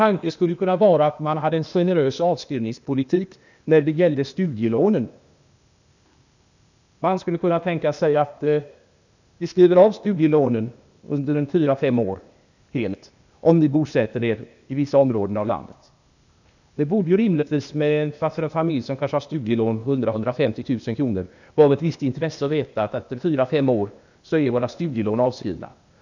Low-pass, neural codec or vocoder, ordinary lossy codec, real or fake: 7.2 kHz; codec, 16 kHz, 0.8 kbps, ZipCodec; none; fake